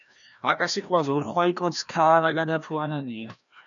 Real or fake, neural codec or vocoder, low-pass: fake; codec, 16 kHz, 1 kbps, FreqCodec, larger model; 7.2 kHz